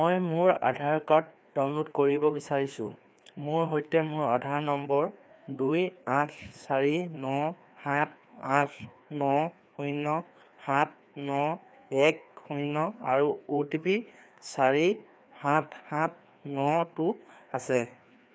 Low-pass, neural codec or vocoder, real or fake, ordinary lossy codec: none; codec, 16 kHz, 2 kbps, FreqCodec, larger model; fake; none